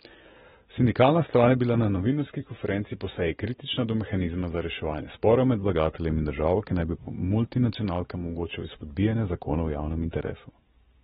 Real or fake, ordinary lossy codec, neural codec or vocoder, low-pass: real; AAC, 16 kbps; none; 19.8 kHz